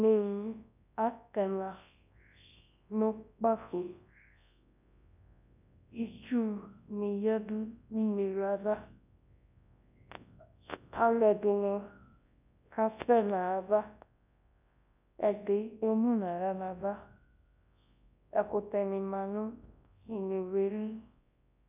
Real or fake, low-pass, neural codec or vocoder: fake; 3.6 kHz; codec, 24 kHz, 0.9 kbps, WavTokenizer, large speech release